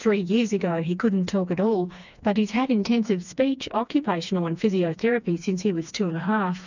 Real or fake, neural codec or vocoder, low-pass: fake; codec, 16 kHz, 2 kbps, FreqCodec, smaller model; 7.2 kHz